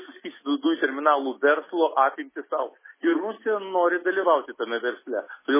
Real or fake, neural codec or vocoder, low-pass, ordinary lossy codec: real; none; 3.6 kHz; MP3, 16 kbps